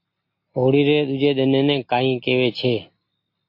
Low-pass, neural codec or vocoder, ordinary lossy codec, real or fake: 5.4 kHz; none; MP3, 32 kbps; real